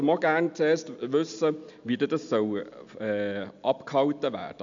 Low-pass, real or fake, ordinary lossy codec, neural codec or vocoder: 7.2 kHz; real; MP3, 64 kbps; none